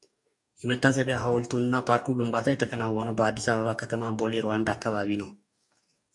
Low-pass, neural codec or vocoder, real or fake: 10.8 kHz; codec, 44.1 kHz, 2.6 kbps, DAC; fake